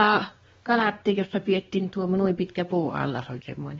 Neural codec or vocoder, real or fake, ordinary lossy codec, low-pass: codec, 16 kHz, 2 kbps, X-Codec, WavLM features, trained on Multilingual LibriSpeech; fake; AAC, 24 kbps; 7.2 kHz